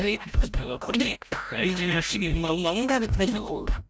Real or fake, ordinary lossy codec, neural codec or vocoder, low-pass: fake; none; codec, 16 kHz, 0.5 kbps, FreqCodec, larger model; none